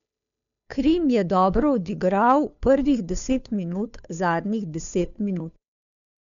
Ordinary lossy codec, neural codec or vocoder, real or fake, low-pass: none; codec, 16 kHz, 2 kbps, FunCodec, trained on Chinese and English, 25 frames a second; fake; 7.2 kHz